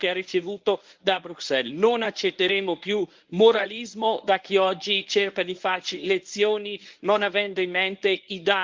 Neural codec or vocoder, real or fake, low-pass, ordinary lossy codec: codec, 24 kHz, 0.9 kbps, WavTokenizer, medium speech release version 1; fake; 7.2 kHz; Opus, 32 kbps